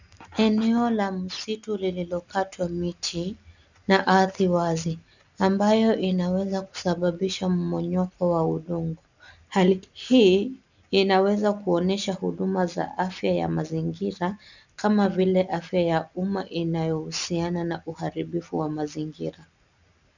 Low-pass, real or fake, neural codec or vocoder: 7.2 kHz; real; none